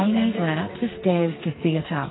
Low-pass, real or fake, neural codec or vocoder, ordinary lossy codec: 7.2 kHz; fake; codec, 44.1 kHz, 2.6 kbps, SNAC; AAC, 16 kbps